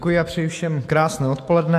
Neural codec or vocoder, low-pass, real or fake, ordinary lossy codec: autoencoder, 48 kHz, 128 numbers a frame, DAC-VAE, trained on Japanese speech; 14.4 kHz; fake; AAC, 64 kbps